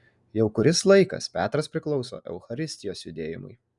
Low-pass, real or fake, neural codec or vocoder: 10.8 kHz; real; none